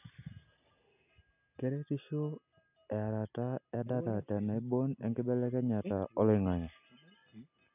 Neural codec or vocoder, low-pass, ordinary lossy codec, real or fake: none; 3.6 kHz; none; real